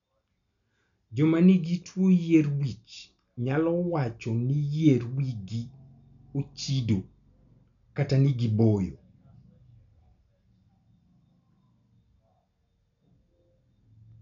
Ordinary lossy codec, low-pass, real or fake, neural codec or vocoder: none; 7.2 kHz; real; none